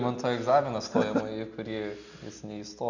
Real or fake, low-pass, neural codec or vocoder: real; 7.2 kHz; none